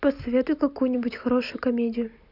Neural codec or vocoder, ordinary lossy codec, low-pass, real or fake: none; none; 5.4 kHz; real